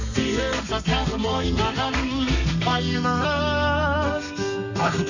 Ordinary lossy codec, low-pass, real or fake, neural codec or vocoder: none; 7.2 kHz; fake; codec, 32 kHz, 1.9 kbps, SNAC